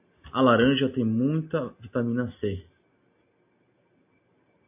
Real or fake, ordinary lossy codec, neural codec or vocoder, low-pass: real; AAC, 32 kbps; none; 3.6 kHz